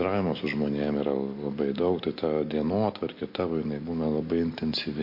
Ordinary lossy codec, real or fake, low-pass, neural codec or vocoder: MP3, 32 kbps; real; 5.4 kHz; none